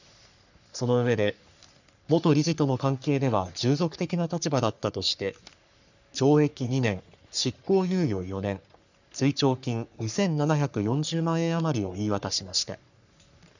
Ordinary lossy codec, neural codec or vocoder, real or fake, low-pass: none; codec, 44.1 kHz, 3.4 kbps, Pupu-Codec; fake; 7.2 kHz